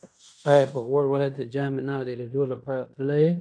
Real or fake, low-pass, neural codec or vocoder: fake; 9.9 kHz; codec, 16 kHz in and 24 kHz out, 0.9 kbps, LongCat-Audio-Codec, fine tuned four codebook decoder